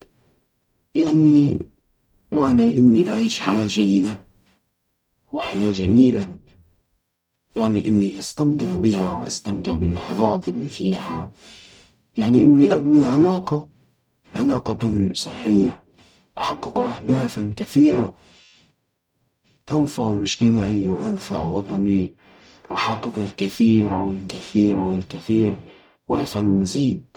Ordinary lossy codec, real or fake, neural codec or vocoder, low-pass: none; fake; codec, 44.1 kHz, 0.9 kbps, DAC; 19.8 kHz